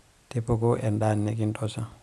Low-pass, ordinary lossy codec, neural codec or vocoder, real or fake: none; none; none; real